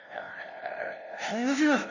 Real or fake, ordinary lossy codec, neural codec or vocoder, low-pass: fake; none; codec, 16 kHz, 0.5 kbps, FunCodec, trained on LibriTTS, 25 frames a second; 7.2 kHz